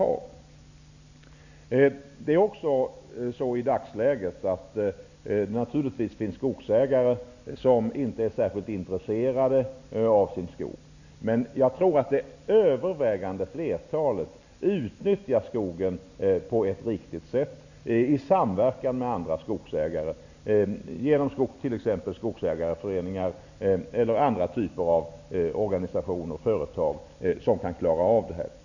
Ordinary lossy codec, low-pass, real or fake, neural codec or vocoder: none; 7.2 kHz; real; none